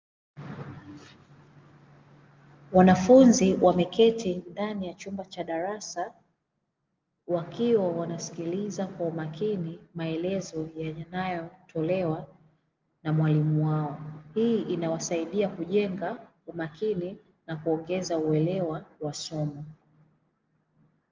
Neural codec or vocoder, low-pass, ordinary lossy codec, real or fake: none; 7.2 kHz; Opus, 32 kbps; real